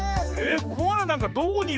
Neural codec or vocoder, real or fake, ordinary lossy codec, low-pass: codec, 16 kHz, 4 kbps, X-Codec, HuBERT features, trained on general audio; fake; none; none